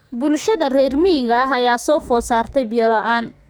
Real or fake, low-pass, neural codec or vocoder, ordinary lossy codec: fake; none; codec, 44.1 kHz, 2.6 kbps, SNAC; none